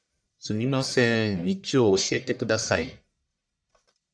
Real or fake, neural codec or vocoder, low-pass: fake; codec, 44.1 kHz, 1.7 kbps, Pupu-Codec; 9.9 kHz